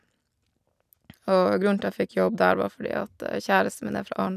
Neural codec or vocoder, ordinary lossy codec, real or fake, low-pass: none; none; real; 14.4 kHz